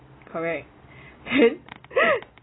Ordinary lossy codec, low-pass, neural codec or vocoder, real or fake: AAC, 16 kbps; 7.2 kHz; none; real